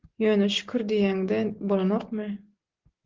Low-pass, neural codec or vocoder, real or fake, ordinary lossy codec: 7.2 kHz; none; real; Opus, 16 kbps